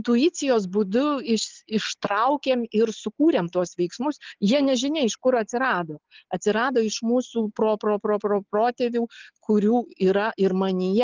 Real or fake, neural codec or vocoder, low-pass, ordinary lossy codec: real; none; 7.2 kHz; Opus, 32 kbps